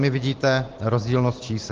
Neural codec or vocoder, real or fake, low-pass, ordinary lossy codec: none; real; 7.2 kHz; Opus, 16 kbps